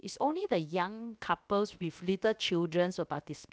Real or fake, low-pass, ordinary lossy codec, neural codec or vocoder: fake; none; none; codec, 16 kHz, 0.7 kbps, FocalCodec